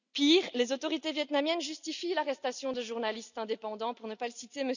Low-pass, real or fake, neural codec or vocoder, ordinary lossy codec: 7.2 kHz; real; none; none